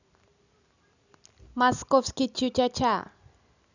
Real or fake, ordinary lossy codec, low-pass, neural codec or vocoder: real; none; 7.2 kHz; none